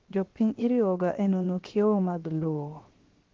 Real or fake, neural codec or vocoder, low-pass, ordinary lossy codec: fake; codec, 16 kHz, 0.7 kbps, FocalCodec; 7.2 kHz; Opus, 16 kbps